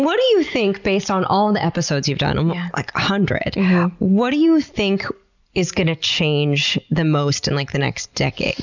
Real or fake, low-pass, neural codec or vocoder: real; 7.2 kHz; none